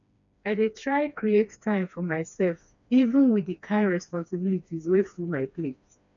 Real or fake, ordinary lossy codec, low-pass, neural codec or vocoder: fake; none; 7.2 kHz; codec, 16 kHz, 2 kbps, FreqCodec, smaller model